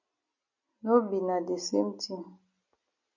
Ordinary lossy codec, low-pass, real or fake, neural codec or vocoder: MP3, 64 kbps; 7.2 kHz; real; none